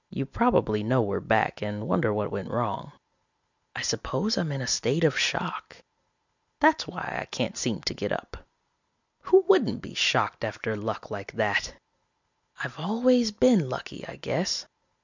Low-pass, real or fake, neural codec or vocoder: 7.2 kHz; real; none